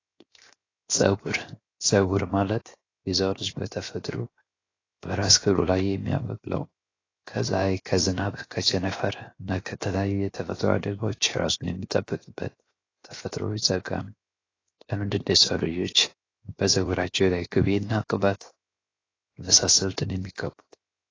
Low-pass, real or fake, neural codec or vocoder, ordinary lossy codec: 7.2 kHz; fake; codec, 16 kHz, 0.7 kbps, FocalCodec; AAC, 32 kbps